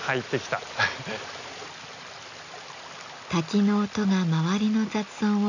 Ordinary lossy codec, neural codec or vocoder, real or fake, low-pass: none; none; real; 7.2 kHz